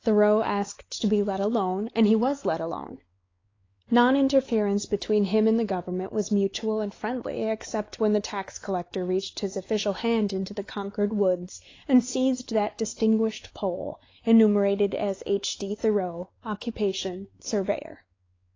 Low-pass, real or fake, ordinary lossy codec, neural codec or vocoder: 7.2 kHz; fake; AAC, 32 kbps; codec, 16 kHz, 4 kbps, X-Codec, WavLM features, trained on Multilingual LibriSpeech